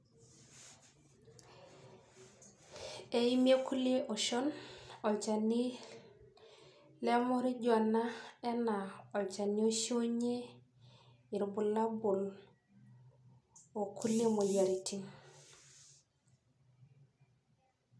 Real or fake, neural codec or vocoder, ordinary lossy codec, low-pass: real; none; none; none